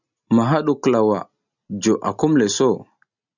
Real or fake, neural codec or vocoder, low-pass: real; none; 7.2 kHz